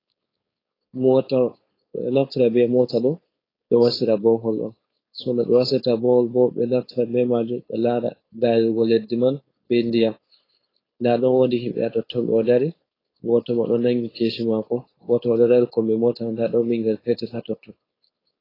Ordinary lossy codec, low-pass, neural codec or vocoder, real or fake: AAC, 24 kbps; 5.4 kHz; codec, 16 kHz, 4.8 kbps, FACodec; fake